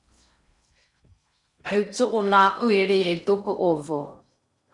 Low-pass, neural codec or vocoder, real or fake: 10.8 kHz; codec, 16 kHz in and 24 kHz out, 0.6 kbps, FocalCodec, streaming, 4096 codes; fake